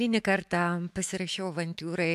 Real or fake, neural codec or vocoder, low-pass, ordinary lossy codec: fake; autoencoder, 48 kHz, 128 numbers a frame, DAC-VAE, trained on Japanese speech; 14.4 kHz; MP3, 96 kbps